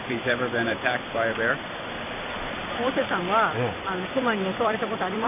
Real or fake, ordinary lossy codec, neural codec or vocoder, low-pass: fake; none; vocoder, 44.1 kHz, 128 mel bands every 256 samples, BigVGAN v2; 3.6 kHz